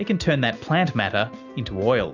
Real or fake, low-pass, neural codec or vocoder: real; 7.2 kHz; none